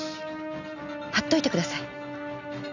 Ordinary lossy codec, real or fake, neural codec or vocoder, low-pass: none; real; none; 7.2 kHz